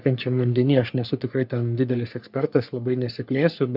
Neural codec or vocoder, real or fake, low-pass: codec, 44.1 kHz, 3.4 kbps, Pupu-Codec; fake; 5.4 kHz